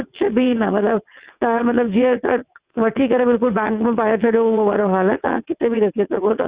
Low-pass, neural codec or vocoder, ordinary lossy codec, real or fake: 3.6 kHz; vocoder, 22.05 kHz, 80 mel bands, WaveNeXt; Opus, 64 kbps; fake